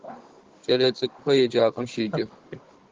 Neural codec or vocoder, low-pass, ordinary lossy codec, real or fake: codec, 16 kHz, 16 kbps, FunCodec, trained on Chinese and English, 50 frames a second; 7.2 kHz; Opus, 16 kbps; fake